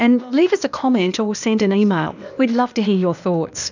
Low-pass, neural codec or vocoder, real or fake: 7.2 kHz; codec, 16 kHz, 0.8 kbps, ZipCodec; fake